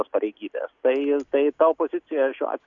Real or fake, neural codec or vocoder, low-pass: real; none; 7.2 kHz